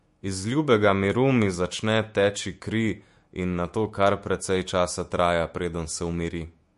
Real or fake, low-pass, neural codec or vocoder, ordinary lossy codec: fake; 14.4 kHz; autoencoder, 48 kHz, 128 numbers a frame, DAC-VAE, trained on Japanese speech; MP3, 48 kbps